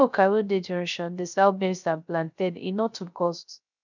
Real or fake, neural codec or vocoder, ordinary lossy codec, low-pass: fake; codec, 16 kHz, 0.3 kbps, FocalCodec; none; 7.2 kHz